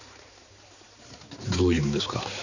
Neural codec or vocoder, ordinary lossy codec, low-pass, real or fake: codec, 16 kHz, 4 kbps, X-Codec, HuBERT features, trained on balanced general audio; none; 7.2 kHz; fake